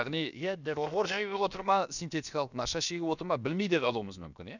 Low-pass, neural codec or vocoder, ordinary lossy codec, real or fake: 7.2 kHz; codec, 16 kHz, about 1 kbps, DyCAST, with the encoder's durations; none; fake